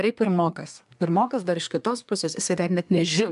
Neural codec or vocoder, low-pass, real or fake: codec, 24 kHz, 1 kbps, SNAC; 10.8 kHz; fake